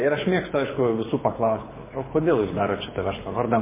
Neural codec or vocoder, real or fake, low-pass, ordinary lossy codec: codec, 24 kHz, 6 kbps, HILCodec; fake; 3.6 kHz; MP3, 16 kbps